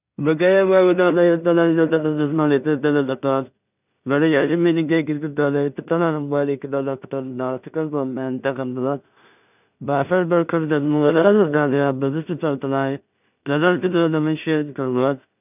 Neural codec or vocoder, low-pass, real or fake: codec, 16 kHz in and 24 kHz out, 0.4 kbps, LongCat-Audio-Codec, two codebook decoder; 3.6 kHz; fake